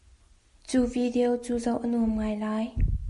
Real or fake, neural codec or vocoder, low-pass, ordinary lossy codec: real; none; 14.4 kHz; MP3, 48 kbps